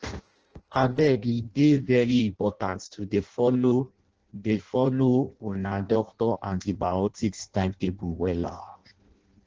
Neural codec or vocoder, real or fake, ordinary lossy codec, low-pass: codec, 16 kHz in and 24 kHz out, 0.6 kbps, FireRedTTS-2 codec; fake; Opus, 16 kbps; 7.2 kHz